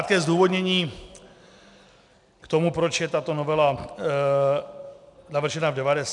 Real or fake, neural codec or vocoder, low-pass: real; none; 10.8 kHz